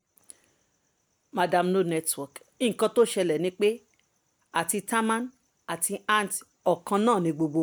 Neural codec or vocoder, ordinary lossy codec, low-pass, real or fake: none; none; none; real